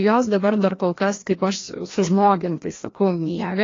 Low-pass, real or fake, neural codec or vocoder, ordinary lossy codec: 7.2 kHz; fake; codec, 16 kHz, 1 kbps, FreqCodec, larger model; AAC, 32 kbps